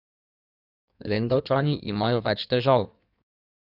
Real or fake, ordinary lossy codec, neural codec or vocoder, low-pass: fake; none; codec, 16 kHz in and 24 kHz out, 1.1 kbps, FireRedTTS-2 codec; 5.4 kHz